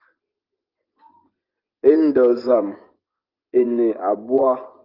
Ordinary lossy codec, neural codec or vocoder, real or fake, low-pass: Opus, 32 kbps; vocoder, 24 kHz, 100 mel bands, Vocos; fake; 5.4 kHz